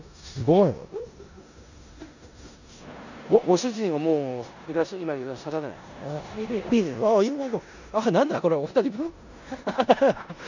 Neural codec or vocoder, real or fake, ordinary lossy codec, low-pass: codec, 16 kHz in and 24 kHz out, 0.9 kbps, LongCat-Audio-Codec, four codebook decoder; fake; none; 7.2 kHz